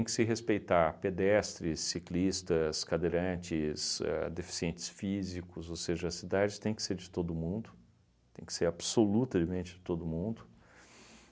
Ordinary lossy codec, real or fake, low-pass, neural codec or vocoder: none; real; none; none